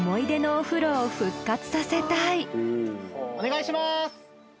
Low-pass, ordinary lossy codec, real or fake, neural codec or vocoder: none; none; real; none